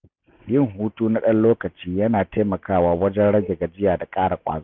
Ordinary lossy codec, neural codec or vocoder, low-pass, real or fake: none; none; 7.2 kHz; real